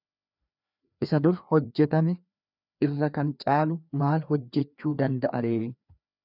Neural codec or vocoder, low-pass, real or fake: codec, 16 kHz, 2 kbps, FreqCodec, larger model; 5.4 kHz; fake